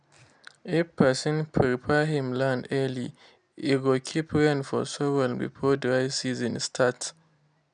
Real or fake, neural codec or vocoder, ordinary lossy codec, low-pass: real; none; none; 9.9 kHz